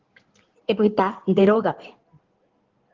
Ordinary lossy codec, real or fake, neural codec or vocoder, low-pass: Opus, 16 kbps; fake; codec, 16 kHz in and 24 kHz out, 2.2 kbps, FireRedTTS-2 codec; 7.2 kHz